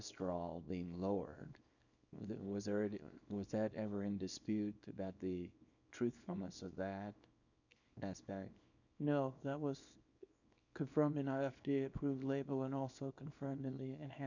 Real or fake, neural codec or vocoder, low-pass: fake; codec, 24 kHz, 0.9 kbps, WavTokenizer, small release; 7.2 kHz